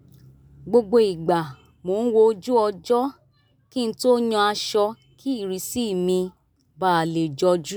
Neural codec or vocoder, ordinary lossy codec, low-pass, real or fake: none; none; none; real